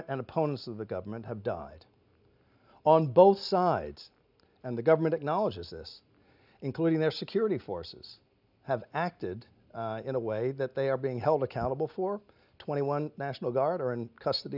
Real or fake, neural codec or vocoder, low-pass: real; none; 5.4 kHz